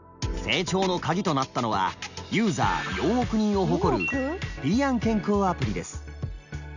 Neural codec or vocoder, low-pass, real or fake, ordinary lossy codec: none; 7.2 kHz; real; none